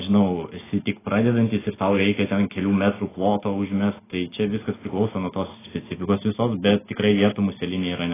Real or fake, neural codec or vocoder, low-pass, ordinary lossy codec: real; none; 3.6 kHz; AAC, 16 kbps